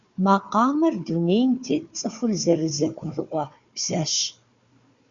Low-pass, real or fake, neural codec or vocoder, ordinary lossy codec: 7.2 kHz; fake; codec, 16 kHz, 4 kbps, FunCodec, trained on Chinese and English, 50 frames a second; Opus, 64 kbps